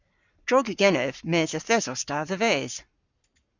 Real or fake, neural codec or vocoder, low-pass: fake; codec, 44.1 kHz, 7.8 kbps, Pupu-Codec; 7.2 kHz